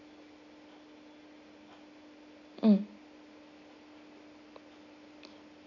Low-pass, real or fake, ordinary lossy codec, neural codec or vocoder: 7.2 kHz; real; none; none